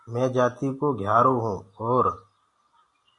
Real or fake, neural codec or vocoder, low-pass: real; none; 10.8 kHz